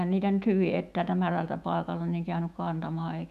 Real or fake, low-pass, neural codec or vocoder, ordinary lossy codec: fake; 14.4 kHz; autoencoder, 48 kHz, 128 numbers a frame, DAC-VAE, trained on Japanese speech; none